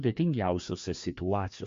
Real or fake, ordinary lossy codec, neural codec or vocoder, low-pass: fake; MP3, 48 kbps; codec, 16 kHz, 2 kbps, FreqCodec, larger model; 7.2 kHz